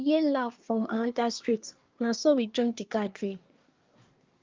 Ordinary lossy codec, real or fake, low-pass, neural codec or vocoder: Opus, 24 kbps; fake; 7.2 kHz; codec, 24 kHz, 1 kbps, SNAC